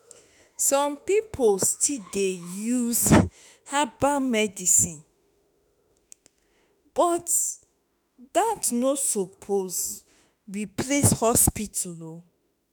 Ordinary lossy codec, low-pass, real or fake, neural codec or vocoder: none; none; fake; autoencoder, 48 kHz, 32 numbers a frame, DAC-VAE, trained on Japanese speech